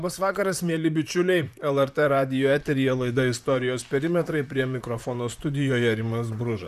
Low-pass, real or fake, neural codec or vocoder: 14.4 kHz; fake; vocoder, 44.1 kHz, 128 mel bands, Pupu-Vocoder